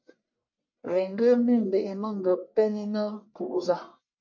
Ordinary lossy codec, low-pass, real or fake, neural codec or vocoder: MP3, 48 kbps; 7.2 kHz; fake; codec, 44.1 kHz, 1.7 kbps, Pupu-Codec